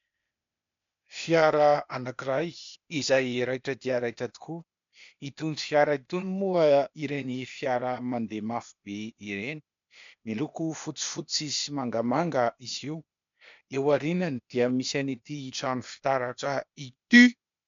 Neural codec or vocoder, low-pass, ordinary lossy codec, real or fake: codec, 16 kHz, 0.8 kbps, ZipCodec; 7.2 kHz; MP3, 64 kbps; fake